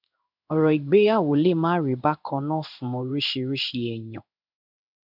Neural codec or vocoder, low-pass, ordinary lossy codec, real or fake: codec, 16 kHz in and 24 kHz out, 1 kbps, XY-Tokenizer; 5.4 kHz; none; fake